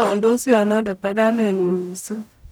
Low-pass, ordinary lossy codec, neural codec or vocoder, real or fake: none; none; codec, 44.1 kHz, 0.9 kbps, DAC; fake